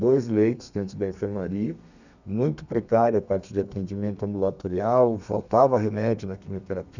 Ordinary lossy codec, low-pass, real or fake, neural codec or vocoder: none; 7.2 kHz; fake; codec, 32 kHz, 1.9 kbps, SNAC